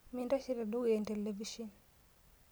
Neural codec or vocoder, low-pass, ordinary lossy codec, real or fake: none; none; none; real